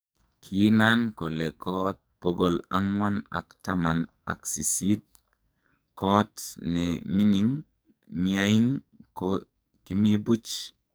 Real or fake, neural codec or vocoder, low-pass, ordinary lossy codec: fake; codec, 44.1 kHz, 2.6 kbps, SNAC; none; none